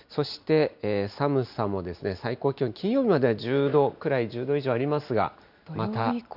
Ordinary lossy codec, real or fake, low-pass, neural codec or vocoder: none; real; 5.4 kHz; none